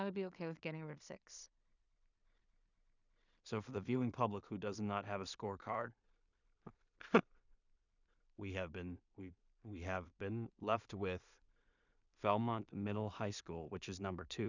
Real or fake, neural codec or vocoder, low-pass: fake; codec, 16 kHz in and 24 kHz out, 0.4 kbps, LongCat-Audio-Codec, two codebook decoder; 7.2 kHz